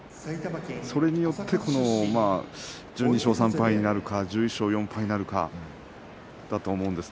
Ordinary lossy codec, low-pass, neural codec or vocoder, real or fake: none; none; none; real